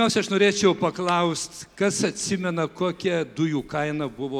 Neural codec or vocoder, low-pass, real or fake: none; 19.8 kHz; real